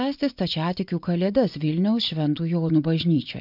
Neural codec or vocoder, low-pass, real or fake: vocoder, 24 kHz, 100 mel bands, Vocos; 5.4 kHz; fake